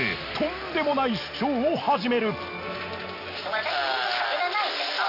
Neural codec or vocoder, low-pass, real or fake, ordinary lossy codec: none; 5.4 kHz; real; none